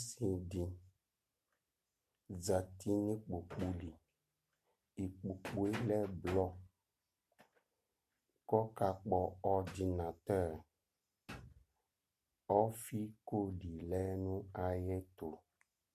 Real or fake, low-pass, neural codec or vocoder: real; 14.4 kHz; none